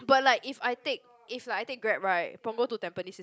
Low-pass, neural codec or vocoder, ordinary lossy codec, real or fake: none; none; none; real